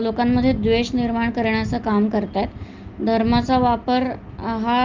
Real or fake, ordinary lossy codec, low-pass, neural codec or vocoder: real; Opus, 24 kbps; 7.2 kHz; none